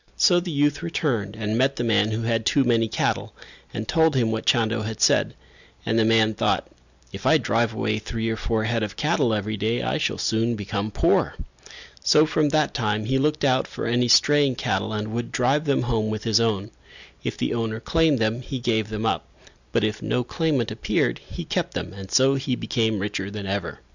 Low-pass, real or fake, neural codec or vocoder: 7.2 kHz; real; none